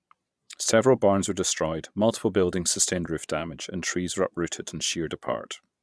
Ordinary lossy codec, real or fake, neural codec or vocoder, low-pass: none; fake; vocoder, 22.05 kHz, 80 mel bands, Vocos; none